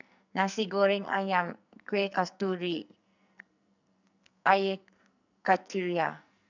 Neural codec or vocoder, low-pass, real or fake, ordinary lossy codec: codec, 44.1 kHz, 2.6 kbps, SNAC; 7.2 kHz; fake; none